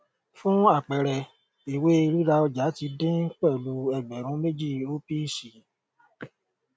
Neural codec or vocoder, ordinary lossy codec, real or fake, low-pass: none; none; real; none